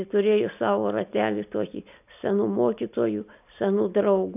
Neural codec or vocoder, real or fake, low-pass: none; real; 3.6 kHz